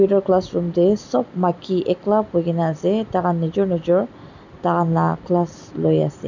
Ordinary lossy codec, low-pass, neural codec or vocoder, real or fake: none; 7.2 kHz; none; real